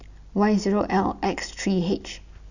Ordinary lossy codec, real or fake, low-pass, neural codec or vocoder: none; real; 7.2 kHz; none